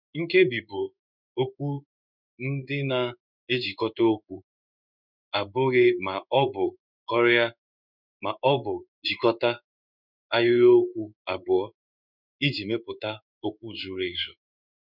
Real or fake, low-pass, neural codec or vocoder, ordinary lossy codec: fake; 5.4 kHz; codec, 16 kHz in and 24 kHz out, 1 kbps, XY-Tokenizer; none